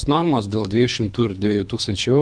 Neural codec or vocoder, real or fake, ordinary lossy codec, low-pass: codec, 24 kHz, 3 kbps, HILCodec; fake; MP3, 96 kbps; 9.9 kHz